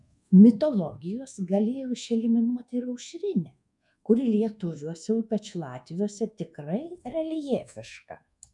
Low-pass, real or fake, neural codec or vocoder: 10.8 kHz; fake; codec, 24 kHz, 1.2 kbps, DualCodec